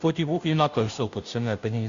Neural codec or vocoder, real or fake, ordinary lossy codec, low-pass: codec, 16 kHz, 0.5 kbps, FunCodec, trained on Chinese and English, 25 frames a second; fake; MP3, 64 kbps; 7.2 kHz